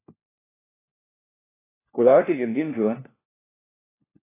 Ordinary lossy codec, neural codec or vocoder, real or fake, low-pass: AAC, 16 kbps; codec, 16 kHz, 1 kbps, FunCodec, trained on LibriTTS, 50 frames a second; fake; 3.6 kHz